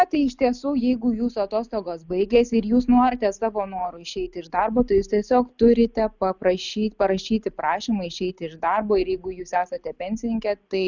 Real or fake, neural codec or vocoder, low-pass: real; none; 7.2 kHz